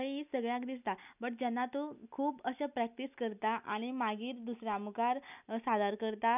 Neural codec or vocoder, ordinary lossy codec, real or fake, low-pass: none; none; real; 3.6 kHz